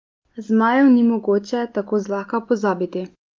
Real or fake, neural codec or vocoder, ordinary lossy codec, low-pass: real; none; Opus, 24 kbps; 7.2 kHz